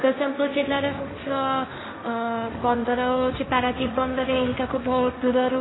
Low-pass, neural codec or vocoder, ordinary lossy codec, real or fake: 7.2 kHz; codec, 16 kHz, 1.1 kbps, Voila-Tokenizer; AAC, 16 kbps; fake